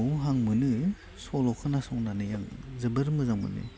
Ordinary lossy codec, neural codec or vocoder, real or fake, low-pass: none; none; real; none